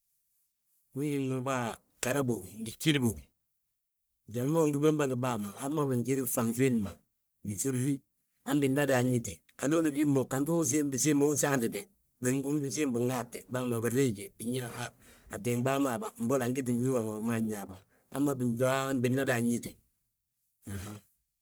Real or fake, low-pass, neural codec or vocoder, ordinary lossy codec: fake; none; codec, 44.1 kHz, 1.7 kbps, Pupu-Codec; none